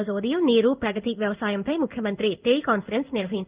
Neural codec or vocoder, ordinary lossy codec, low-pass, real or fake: codec, 16 kHz in and 24 kHz out, 1 kbps, XY-Tokenizer; Opus, 32 kbps; 3.6 kHz; fake